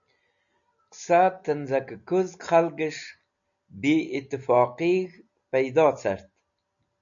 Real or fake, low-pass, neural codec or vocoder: real; 7.2 kHz; none